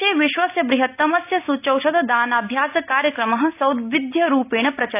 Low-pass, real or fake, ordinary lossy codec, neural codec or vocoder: 3.6 kHz; real; none; none